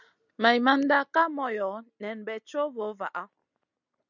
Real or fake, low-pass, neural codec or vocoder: real; 7.2 kHz; none